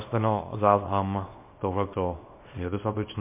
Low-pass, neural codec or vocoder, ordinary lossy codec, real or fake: 3.6 kHz; codec, 24 kHz, 0.9 kbps, WavTokenizer, small release; MP3, 24 kbps; fake